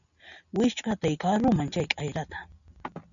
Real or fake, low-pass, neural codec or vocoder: real; 7.2 kHz; none